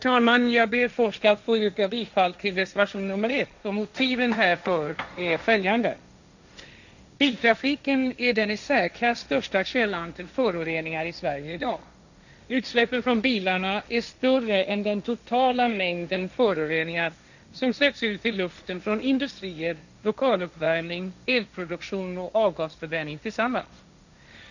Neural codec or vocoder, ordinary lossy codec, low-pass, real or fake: codec, 16 kHz, 1.1 kbps, Voila-Tokenizer; none; 7.2 kHz; fake